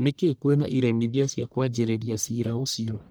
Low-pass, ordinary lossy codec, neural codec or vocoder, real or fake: none; none; codec, 44.1 kHz, 1.7 kbps, Pupu-Codec; fake